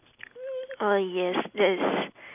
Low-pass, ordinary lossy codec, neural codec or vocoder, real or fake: 3.6 kHz; none; none; real